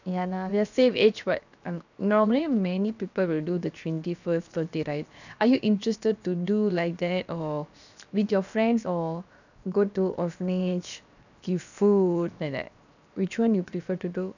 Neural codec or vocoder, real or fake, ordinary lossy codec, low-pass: codec, 16 kHz, 0.7 kbps, FocalCodec; fake; none; 7.2 kHz